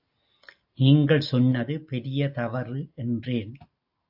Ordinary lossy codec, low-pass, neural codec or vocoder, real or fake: AAC, 48 kbps; 5.4 kHz; none; real